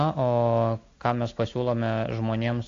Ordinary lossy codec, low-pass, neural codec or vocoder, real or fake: AAC, 48 kbps; 7.2 kHz; none; real